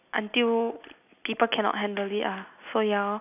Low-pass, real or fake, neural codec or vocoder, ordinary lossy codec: 3.6 kHz; real; none; none